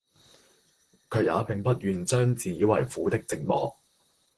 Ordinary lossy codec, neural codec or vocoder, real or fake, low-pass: Opus, 16 kbps; vocoder, 44.1 kHz, 128 mel bands, Pupu-Vocoder; fake; 10.8 kHz